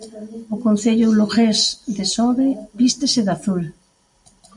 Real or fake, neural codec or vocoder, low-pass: real; none; 10.8 kHz